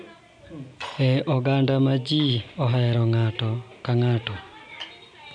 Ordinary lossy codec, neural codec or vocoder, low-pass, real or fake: none; none; 9.9 kHz; real